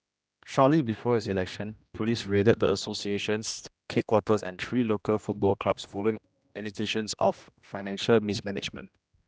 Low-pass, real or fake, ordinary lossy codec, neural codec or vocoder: none; fake; none; codec, 16 kHz, 1 kbps, X-Codec, HuBERT features, trained on general audio